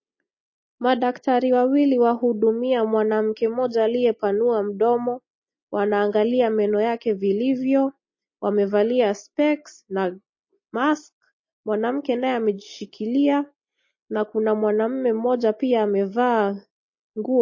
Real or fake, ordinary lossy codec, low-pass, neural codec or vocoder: real; MP3, 32 kbps; 7.2 kHz; none